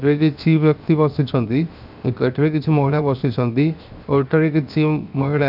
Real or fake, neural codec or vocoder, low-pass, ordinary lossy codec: fake; codec, 16 kHz, 0.7 kbps, FocalCodec; 5.4 kHz; none